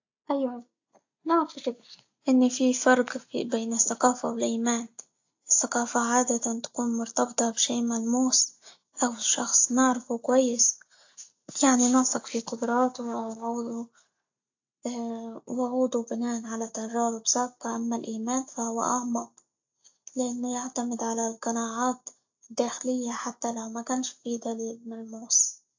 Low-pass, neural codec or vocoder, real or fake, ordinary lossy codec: 7.2 kHz; none; real; AAC, 48 kbps